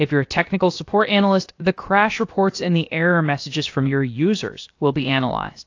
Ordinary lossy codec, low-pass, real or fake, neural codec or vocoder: AAC, 48 kbps; 7.2 kHz; fake; codec, 16 kHz, about 1 kbps, DyCAST, with the encoder's durations